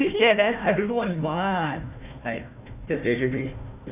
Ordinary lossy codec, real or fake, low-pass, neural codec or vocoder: none; fake; 3.6 kHz; codec, 16 kHz, 1 kbps, FunCodec, trained on Chinese and English, 50 frames a second